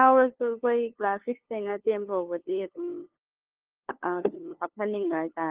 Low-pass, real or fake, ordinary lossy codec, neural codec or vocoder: 3.6 kHz; fake; Opus, 16 kbps; codec, 16 kHz, 2 kbps, FunCodec, trained on LibriTTS, 25 frames a second